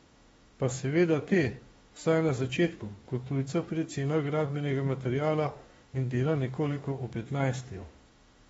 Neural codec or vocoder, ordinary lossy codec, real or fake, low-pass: autoencoder, 48 kHz, 32 numbers a frame, DAC-VAE, trained on Japanese speech; AAC, 24 kbps; fake; 19.8 kHz